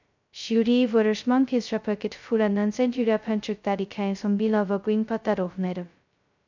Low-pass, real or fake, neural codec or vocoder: 7.2 kHz; fake; codec, 16 kHz, 0.2 kbps, FocalCodec